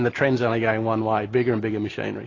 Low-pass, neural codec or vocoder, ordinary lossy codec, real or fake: 7.2 kHz; none; AAC, 32 kbps; real